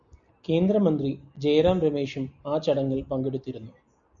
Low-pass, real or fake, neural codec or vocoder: 7.2 kHz; real; none